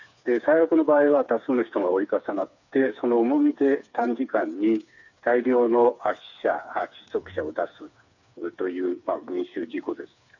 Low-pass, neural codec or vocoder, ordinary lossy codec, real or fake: 7.2 kHz; codec, 16 kHz, 4 kbps, FreqCodec, smaller model; none; fake